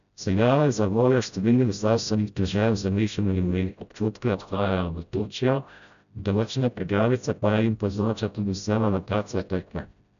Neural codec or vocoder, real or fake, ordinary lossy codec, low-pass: codec, 16 kHz, 0.5 kbps, FreqCodec, smaller model; fake; none; 7.2 kHz